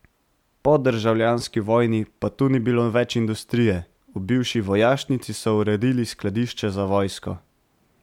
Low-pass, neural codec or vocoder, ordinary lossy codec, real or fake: 19.8 kHz; none; MP3, 96 kbps; real